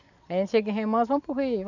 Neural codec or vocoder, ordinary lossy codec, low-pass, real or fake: none; none; 7.2 kHz; real